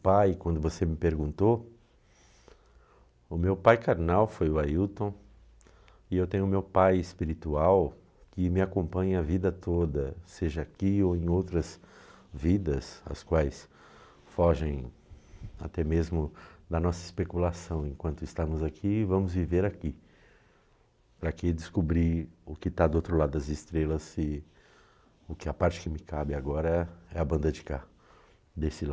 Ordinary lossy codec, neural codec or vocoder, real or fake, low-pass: none; none; real; none